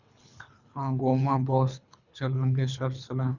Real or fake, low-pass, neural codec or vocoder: fake; 7.2 kHz; codec, 24 kHz, 3 kbps, HILCodec